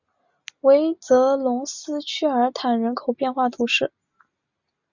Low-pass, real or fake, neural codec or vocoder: 7.2 kHz; real; none